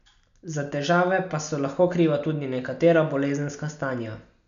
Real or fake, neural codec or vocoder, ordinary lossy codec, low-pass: real; none; MP3, 96 kbps; 7.2 kHz